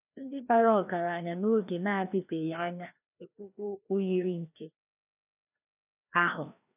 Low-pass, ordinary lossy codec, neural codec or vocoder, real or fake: 3.6 kHz; none; codec, 16 kHz, 1 kbps, FreqCodec, larger model; fake